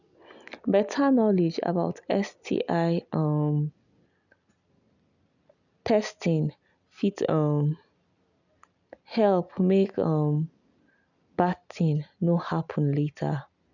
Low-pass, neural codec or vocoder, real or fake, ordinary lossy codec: 7.2 kHz; none; real; none